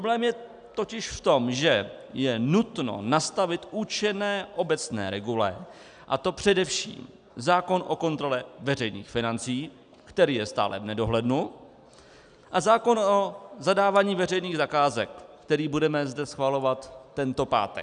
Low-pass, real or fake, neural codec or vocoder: 9.9 kHz; real; none